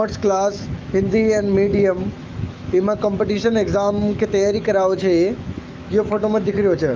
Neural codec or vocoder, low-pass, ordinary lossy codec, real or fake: autoencoder, 48 kHz, 128 numbers a frame, DAC-VAE, trained on Japanese speech; 7.2 kHz; Opus, 24 kbps; fake